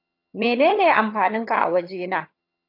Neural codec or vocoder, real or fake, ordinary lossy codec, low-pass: vocoder, 22.05 kHz, 80 mel bands, HiFi-GAN; fake; AAC, 32 kbps; 5.4 kHz